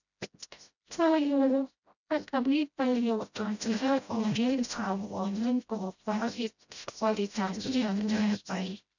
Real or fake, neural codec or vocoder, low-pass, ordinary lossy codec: fake; codec, 16 kHz, 0.5 kbps, FreqCodec, smaller model; 7.2 kHz; none